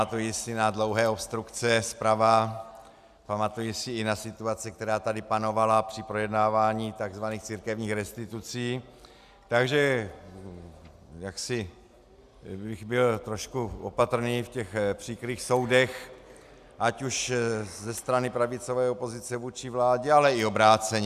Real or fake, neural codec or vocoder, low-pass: real; none; 14.4 kHz